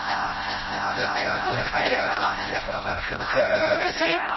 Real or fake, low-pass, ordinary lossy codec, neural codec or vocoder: fake; 7.2 kHz; MP3, 24 kbps; codec, 16 kHz, 0.5 kbps, FreqCodec, smaller model